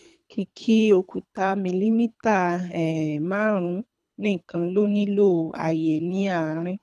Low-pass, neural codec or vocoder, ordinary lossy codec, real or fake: none; codec, 24 kHz, 3 kbps, HILCodec; none; fake